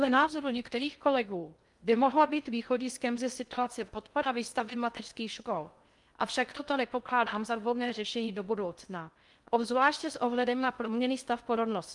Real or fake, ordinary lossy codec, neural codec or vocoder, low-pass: fake; Opus, 32 kbps; codec, 16 kHz in and 24 kHz out, 0.6 kbps, FocalCodec, streaming, 2048 codes; 10.8 kHz